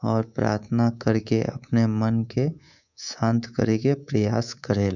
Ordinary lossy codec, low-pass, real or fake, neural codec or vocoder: none; 7.2 kHz; fake; codec, 24 kHz, 3.1 kbps, DualCodec